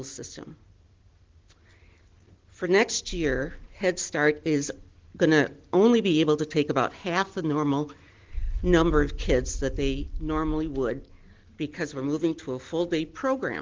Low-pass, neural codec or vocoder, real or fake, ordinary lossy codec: 7.2 kHz; none; real; Opus, 16 kbps